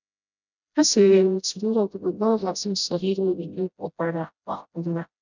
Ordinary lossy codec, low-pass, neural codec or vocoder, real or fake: none; 7.2 kHz; codec, 16 kHz, 0.5 kbps, FreqCodec, smaller model; fake